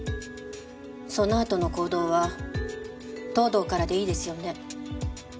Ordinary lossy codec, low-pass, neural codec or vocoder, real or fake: none; none; none; real